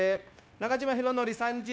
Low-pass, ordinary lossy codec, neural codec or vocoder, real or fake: none; none; codec, 16 kHz, 0.9 kbps, LongCat-Audio-Codec; fake